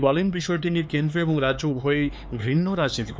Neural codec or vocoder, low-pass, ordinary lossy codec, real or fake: codec, 16 kHz, 4 kbps, X-Codec, HuBERT features, trained on LibriSpeech; none; none; fake